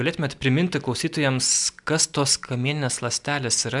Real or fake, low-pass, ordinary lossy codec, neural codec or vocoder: real; 10.8 kHz; MP3, 96 kbps; none